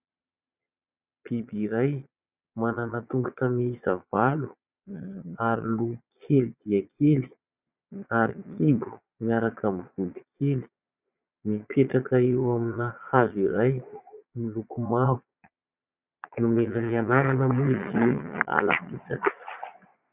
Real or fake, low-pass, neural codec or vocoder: fake; 3.6 kHz; vocoder, 22.05 kHz, 80 mel bands, Vocos